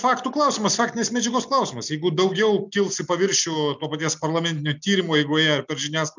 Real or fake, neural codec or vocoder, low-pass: real; none; 7.2 kHz